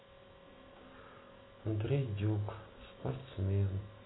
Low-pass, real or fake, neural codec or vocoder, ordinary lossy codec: 7.2 kHz; real; none; AAC, 16 kbps